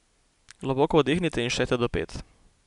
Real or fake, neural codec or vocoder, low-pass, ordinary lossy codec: real; none; 10.8 kHz; none